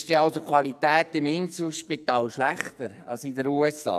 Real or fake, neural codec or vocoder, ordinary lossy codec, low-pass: fake; codec, 44.1 kHz, 2.6 kbps, SNAC; none; 14.4 kHz